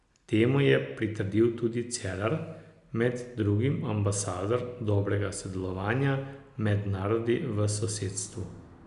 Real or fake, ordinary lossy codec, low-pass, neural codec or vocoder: real; none; 10.8 kHz; none